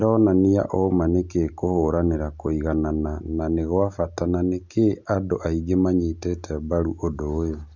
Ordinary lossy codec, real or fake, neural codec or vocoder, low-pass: none; real; none; 7.2 kHz